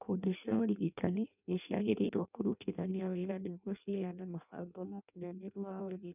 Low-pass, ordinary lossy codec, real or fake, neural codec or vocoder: 3.6 kHz; none; fake; codec, 16 kHz in and 24 kHz out, 0.6 kbps, FireRedTTS-2 codec